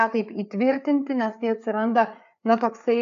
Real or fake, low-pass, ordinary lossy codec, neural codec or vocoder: fake; 7.2 kHz; AAC, 64 kbps; codec, 16 kHz, 4 kbps, FreqCodec, larger model